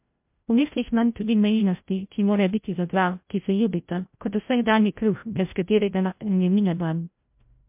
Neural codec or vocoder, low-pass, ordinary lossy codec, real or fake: codec, 16 kHz, 0.5 kbps, FreqCodec, larger model; 3.6 kHz; MP3, 32 kbps; fake